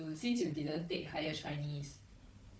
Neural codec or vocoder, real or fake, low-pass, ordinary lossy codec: codec, 16 kHz, 4 kbps, FunCodec, trained on Chinese and English, 50 frames a second; fake; none; none